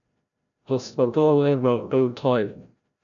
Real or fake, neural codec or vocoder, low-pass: fake; codec, 16 kHz, 0.5 kbps, FreqCodec, larger model; 7.2 kHz